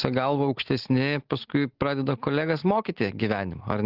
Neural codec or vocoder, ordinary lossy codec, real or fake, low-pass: none; Opus, 16 kbps; real; 5.4 kHz